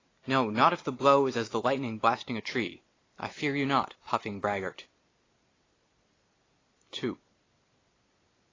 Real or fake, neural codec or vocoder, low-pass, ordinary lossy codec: real; none; 7.2 kHz; AAC, 32 kbps